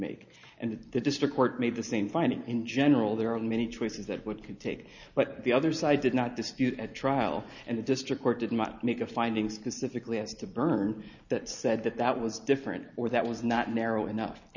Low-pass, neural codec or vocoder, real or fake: 7.2 kHz; none; real